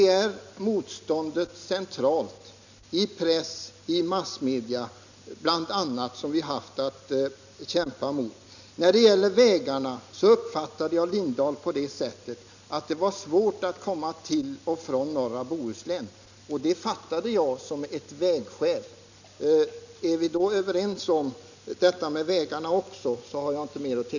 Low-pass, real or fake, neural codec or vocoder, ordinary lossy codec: 7.2 kHz; real; none; none